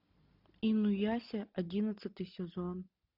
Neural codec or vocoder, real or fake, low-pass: none; real; 5.4 kHz